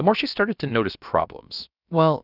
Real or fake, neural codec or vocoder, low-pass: fake; codec, 16 kHz, about 1 kbps, DyCAST, with the encoder's durations; 5.4 kHz